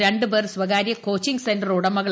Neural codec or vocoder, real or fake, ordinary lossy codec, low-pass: none; real; none; none